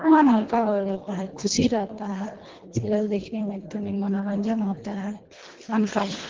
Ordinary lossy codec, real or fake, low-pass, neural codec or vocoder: Opus, 16 kbps; fake; 7.2 kHz; codec, 24 kHz, 1.5 kbps, HILCodec